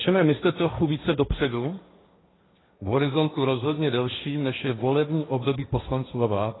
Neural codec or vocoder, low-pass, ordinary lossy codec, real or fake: codec, 16 kHz, 1.1 kbps, Voila-Tokenizer; 7.2 kHz; AAC, 16 kbps; fake